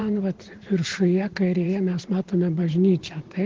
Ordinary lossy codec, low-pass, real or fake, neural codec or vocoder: Opus, 16 kbps; 7.2 kHz; fake; vocoder, 44.1 kHz, 128 mel bands every 512 samples, BigVGAN v2